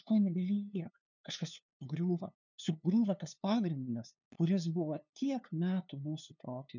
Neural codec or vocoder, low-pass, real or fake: codec, 16 kHz, 2 kbps, FunCodec, trained on LibriTTS, 25 frames a second; 7.2 kHz; fake